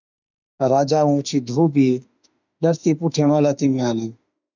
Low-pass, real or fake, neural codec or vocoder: 7.2 kHz; fake; autoencoder, 48 kHz, 32 numbers a frame, DAC-VAE, trained on Japanese speech